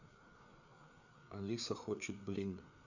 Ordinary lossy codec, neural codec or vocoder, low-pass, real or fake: none; codec, 16 kHz, 4 kbps, FreqCodec, larger model; 7.2 kHz; fake